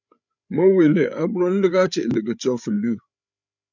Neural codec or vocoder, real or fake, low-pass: codec, 16 kHz, 8 kbps, FreqCodec, larger model; fake; 7.2 kHz